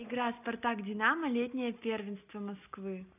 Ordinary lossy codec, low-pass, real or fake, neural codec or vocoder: none; 3.6 kHz; real; none